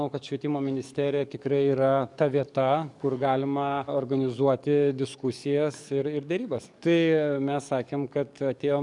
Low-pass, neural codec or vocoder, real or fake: 10.8 kHz; codec, 44.1 kHz, 7.8 kbps, DAC; fake